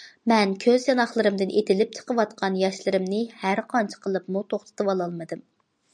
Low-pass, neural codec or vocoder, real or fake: 9.9 kHz; none; real